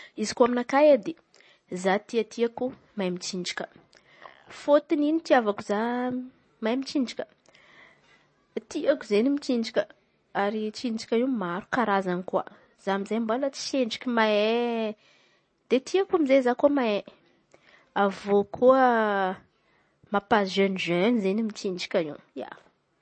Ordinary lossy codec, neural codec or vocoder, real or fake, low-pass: MP3, 32 kbps; none; real; 9.9 kHz